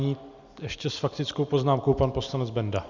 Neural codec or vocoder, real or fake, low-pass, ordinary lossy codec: none; real; 7.2 kHz; Opus, 64 kbps